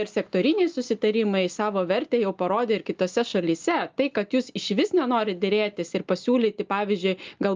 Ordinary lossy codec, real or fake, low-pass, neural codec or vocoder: Opus, 24 kbps; real; 7.2 kHz; none